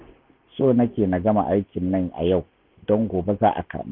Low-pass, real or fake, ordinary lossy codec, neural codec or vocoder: 5.4 kHz; real; none; none